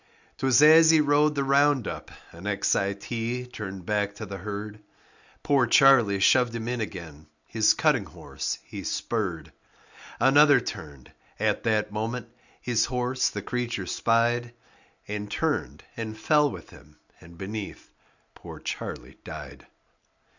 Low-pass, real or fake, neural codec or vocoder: 7.2 kHz; real; none